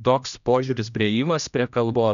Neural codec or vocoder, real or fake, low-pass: codec, 16 kHz, 1 kbps, X-Codec, HuBERT features, trained on general audio; fake; 7.2 kHz